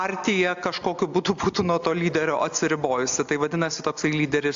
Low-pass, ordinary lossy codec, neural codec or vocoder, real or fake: 7.2 kHz; AAC, 64 kbps; none; real